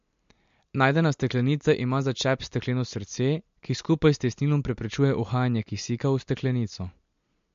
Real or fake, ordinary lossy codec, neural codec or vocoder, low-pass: real; MP3, 48 kbps; none; 7.2 kHz